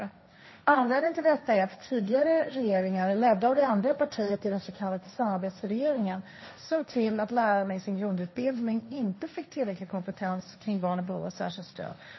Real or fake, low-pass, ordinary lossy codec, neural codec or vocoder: fake; 7.2 kHz; MP3, 24 kbps; codec, 16 kHz, 1.1 kbps, Voila-Tokenizer